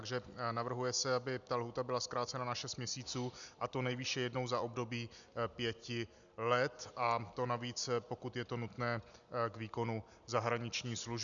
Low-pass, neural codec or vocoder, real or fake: 7.2 kHz; none; real